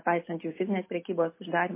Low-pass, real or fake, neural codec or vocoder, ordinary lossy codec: 3.6 kHz; real; none; MP3, 24 kbps